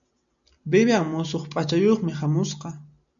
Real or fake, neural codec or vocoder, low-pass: real; none; 7.2 kHz